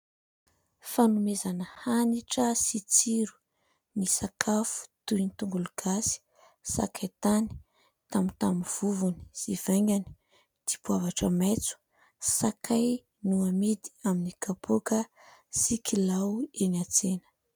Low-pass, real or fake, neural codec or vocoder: 19.8 kHz; real; none